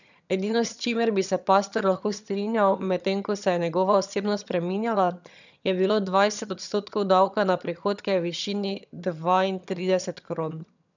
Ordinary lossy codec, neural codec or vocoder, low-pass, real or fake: none; vocoder, 22.05 kHz, 80 mel bands, HiFi-GAN; 7.2 kHz; fake